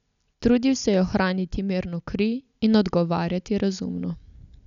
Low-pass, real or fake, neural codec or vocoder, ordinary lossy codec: 7.2 kHz; real; none; none